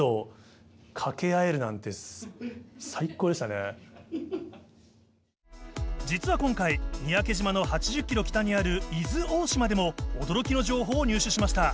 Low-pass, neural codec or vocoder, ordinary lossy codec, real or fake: none; none; none; real